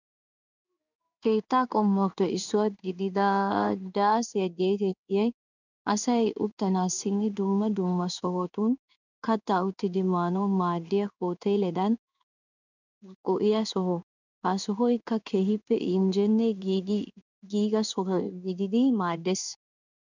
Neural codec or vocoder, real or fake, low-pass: codec, 16 kHz in and 24 kHz out, 1 kbps, XY-Tokenizer; fake; 7.2 kHz